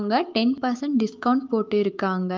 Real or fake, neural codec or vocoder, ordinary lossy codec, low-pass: fake; autoencoder, 48 kHz, 128 numbers a frame, DAC-VAE, trained on Japanese speech; Opus, 24 kbps; 7.2 kHz